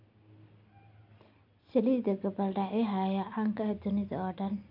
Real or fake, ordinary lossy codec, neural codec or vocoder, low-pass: real; AAC, 32 kbps; none; 5.4 kHz